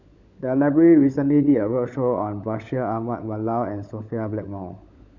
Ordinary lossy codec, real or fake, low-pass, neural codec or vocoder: none; fake; 7.2 kHz; codec, 16 kHz, 16 kbps, FunCodec, trained on LibriTTS, 50 frames a second